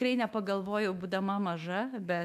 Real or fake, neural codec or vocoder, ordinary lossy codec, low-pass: fake; autoencoder, 48 kHz, 128 numbers a frame, DAC-VAE, trained on Japanese speech; MP3, 96 kbps; 14.4 kHz